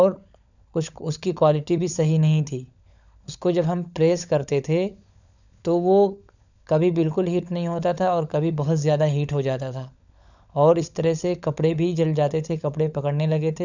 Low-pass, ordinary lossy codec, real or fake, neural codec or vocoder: 7.2 kHz; none; fake; codec, 16 kHz, 16 kbps, FunCodec, trained on LibriTTS, 50 frames a second